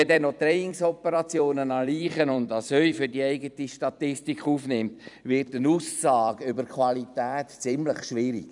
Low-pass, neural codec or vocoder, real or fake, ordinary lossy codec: 10.8 kHz; vocoder, 44.1 kHz, 128 mel bands every 256 samples, BigVGAN v2; fake; none